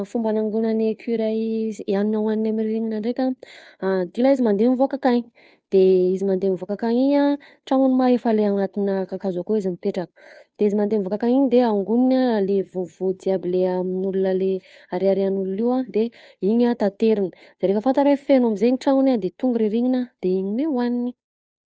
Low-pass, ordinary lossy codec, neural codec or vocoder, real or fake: none; none; codec, 16 kHz, 2 kbps, FunCodec, trained on Chinese and English, 25 frames a second; fake